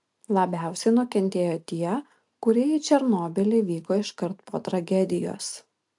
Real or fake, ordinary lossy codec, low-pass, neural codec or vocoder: real; AAC, 64 kbps; 10.8 kHz; none